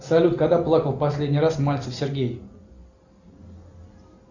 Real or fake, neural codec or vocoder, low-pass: real; none; 7.2 kHz